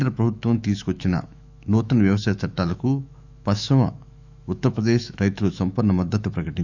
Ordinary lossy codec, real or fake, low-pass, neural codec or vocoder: none; fake; 7.2 kHz; autoencoder, 48 kHz, 128 numbers a frame, DAC-VAE, trained on Japanese speech